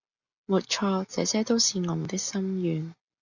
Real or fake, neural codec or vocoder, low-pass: real; none; 7.2 kHz